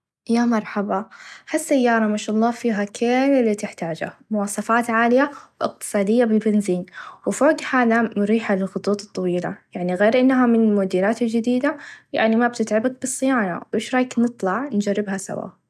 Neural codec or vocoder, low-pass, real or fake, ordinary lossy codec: none; none; real; none